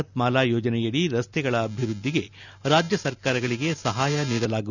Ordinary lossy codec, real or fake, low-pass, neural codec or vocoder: none; real; 7.2 kHz; none